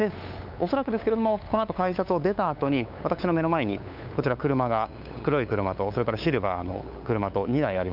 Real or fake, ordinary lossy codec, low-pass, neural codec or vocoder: fake; none; 5.4 kHz; codec, 16 kHz, 8 kbps, FunCodec, trained on LibriTTS, 25 frames a second